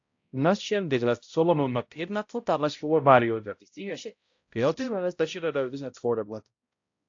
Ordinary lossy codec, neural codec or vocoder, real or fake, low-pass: AAC, 48 kbps; codec, 16 kHz, 0.5 kbps, X-Codec, HuBERT features, trained on balanced general audio; fake; 7.2 kHz